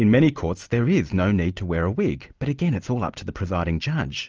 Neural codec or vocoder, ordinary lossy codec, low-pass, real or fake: none; Opus, 24 kbps; 7.2 kHz; real